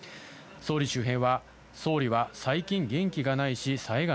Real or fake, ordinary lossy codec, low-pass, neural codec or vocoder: real; none; none; none